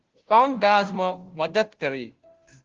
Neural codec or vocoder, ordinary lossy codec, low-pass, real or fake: codec, 16 kHz, 0.5 kbps, FunCodec, trained on Chinese and English, 25 frames a second; Opus, 16 kbps; 7.2 kHz; fake